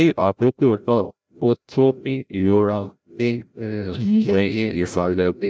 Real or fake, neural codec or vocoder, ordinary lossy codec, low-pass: fake; codec, 16 kHz, 0.5 kbps, FreqCodec, larger model; none; none